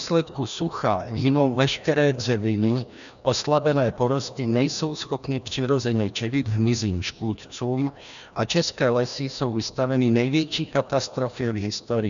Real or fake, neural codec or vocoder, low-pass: fake; codec, 16 kHz, 1 kbps, FreqCodec, larger model; 7.2 kHz